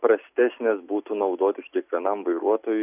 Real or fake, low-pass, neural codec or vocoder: real; 3.6 kHz; none